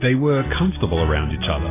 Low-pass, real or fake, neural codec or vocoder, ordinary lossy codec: 3.6 kHz; real; none; AAC, 16 kbps